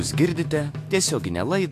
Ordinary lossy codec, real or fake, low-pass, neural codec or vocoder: AAC, 64 kbps; fake; 14.4 kHz; autoencoder, 48 kHz, 128 numbers a frame, DAC-VAE, trained on Japanese speech